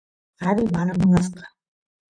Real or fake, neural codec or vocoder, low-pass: fake; vocoder, 44.1 kHz, 128 mel bands, Pupu-Vocoder; 9.9 kHz